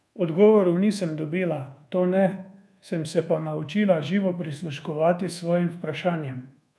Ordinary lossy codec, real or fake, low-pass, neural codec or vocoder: none; fake; none; codec, 24 kHz, 1.2 kbps, DualCodec